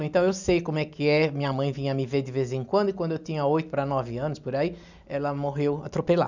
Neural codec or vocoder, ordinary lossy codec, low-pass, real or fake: none; none; 7.2 kHz; real